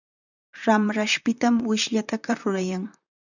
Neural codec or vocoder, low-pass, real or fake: vocoder, 44.1 kHz, 128 mel bands, Pupu-Vocoder; 7.2 kHz; fake